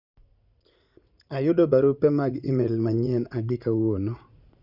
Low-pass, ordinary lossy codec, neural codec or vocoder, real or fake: 5.4 kHz; Opus, 64 kbps; vocoder, 44.1 kHz, 80 mel bands, Vocos; fake